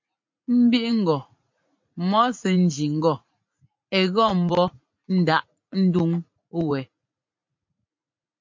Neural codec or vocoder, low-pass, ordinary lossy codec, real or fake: vocoder, 44.1 kHz, 80 mel bands, Vocos; 7.2 kHz; MP3, 48 kbps; fake